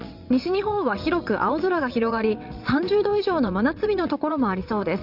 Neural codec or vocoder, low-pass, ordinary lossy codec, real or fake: vocoder, 22.05 kHz, 80 mel bands, WaveNeXt; 5.4 kHz; Opus, 64 kbps; fake